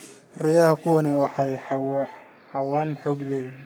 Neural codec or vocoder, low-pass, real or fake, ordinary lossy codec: codec, 44.1 kHz, 3.4 kbps, Pupu-Codec; none; fake; none